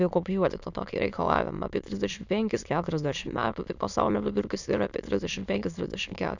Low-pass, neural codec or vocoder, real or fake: 7.2 kHz; autoencoder, 22.05 kHz, a latent of 192 numbers a frame, VITS, trained on many speakers; fake